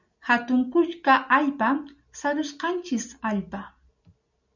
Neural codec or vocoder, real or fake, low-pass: none; real; 7.2 kHz